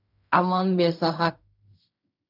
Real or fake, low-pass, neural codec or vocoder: fake; 5.4 kHz; codec, 16 kHz in and 24 kHz out, 0.4 kbps, LongCat-Audio-Codec, fine tuned four codebook decoder